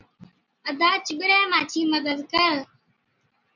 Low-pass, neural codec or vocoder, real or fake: 7.2 kHz; none; real